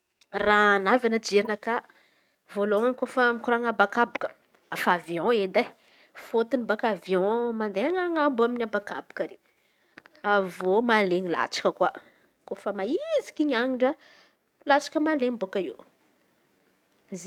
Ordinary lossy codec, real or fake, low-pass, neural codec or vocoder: none; fake; 19.8 kHz; codec, 44.1 kHz, 7.8 kbps, DAC